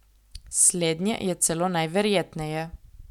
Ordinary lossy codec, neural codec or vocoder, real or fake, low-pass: none; none; real; 19.8 kHz